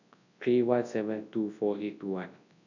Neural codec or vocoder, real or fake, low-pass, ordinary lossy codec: codec, 24 kHz, 0.9 kbps, WavTokenizer, large speech release; fake; 7.2 kHz; none